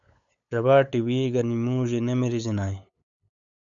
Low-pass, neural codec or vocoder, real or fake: 7.2 kHz; codec, 16 kHz, 8 kbps, FunCodec, trained on LibriTTS, 25 frames a second; fake